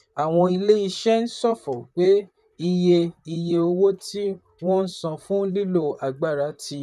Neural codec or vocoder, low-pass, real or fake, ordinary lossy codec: vocoder, 44.1 kHz, 128 mel bands, Pupu-Vocoder; 14.4 kHz; fake; none